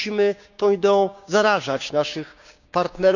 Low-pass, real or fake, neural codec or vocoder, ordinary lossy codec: 7.2 kHz; fake; codec, 16 kHz, 6 kbps, DAC; none